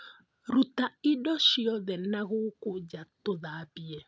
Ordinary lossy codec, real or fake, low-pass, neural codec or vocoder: none; real; none; none